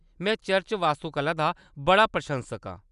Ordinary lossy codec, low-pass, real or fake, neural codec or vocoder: none; 10.8 kHz; real; none